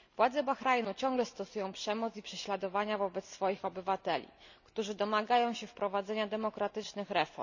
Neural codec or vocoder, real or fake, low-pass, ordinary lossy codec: none; real; 7.2 kHz; none